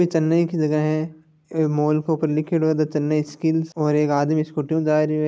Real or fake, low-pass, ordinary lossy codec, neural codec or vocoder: real; none; none; none